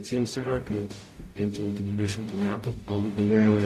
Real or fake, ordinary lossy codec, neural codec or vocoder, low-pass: fake; AAC, 64 kbps; codec, 44.1 kHz, 0.9 kbps, DAC; 14.4 kHz